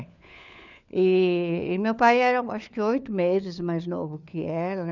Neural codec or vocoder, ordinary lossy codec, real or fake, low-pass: codec, 16 kHz, 4 kbps, FunCodec, trained on LibriTTS, 50 frames a second; none; fake; 7.2 kHz